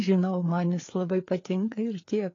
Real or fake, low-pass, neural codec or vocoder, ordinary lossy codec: fake; 7.2 kHz; codec, 16 kHz, 4 kbps, FreqCodec, larger model; AAC, 32 kbps